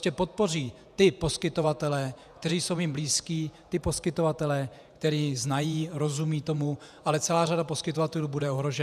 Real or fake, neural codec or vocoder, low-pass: fake; vocoder, 48 kHz, 128 mel bands, Vocos; 14.4 kHz